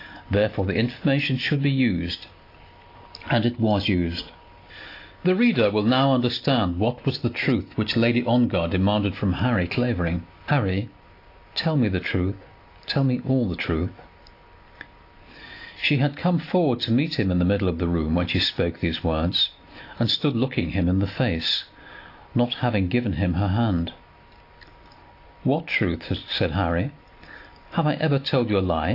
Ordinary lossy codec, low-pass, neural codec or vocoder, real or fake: AAC, 32 kbps; 5.4 kHz; none; real